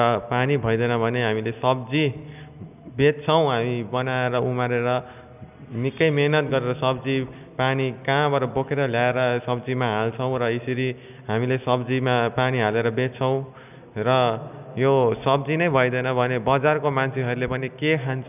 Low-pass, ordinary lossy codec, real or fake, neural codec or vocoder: 3.6 kHz; none; real; none